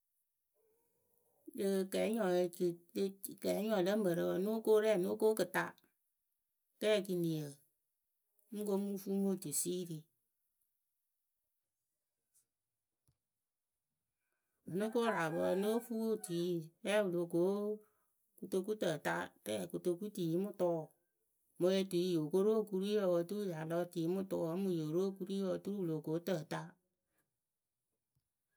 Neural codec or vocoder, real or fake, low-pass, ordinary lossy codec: none; real; none; none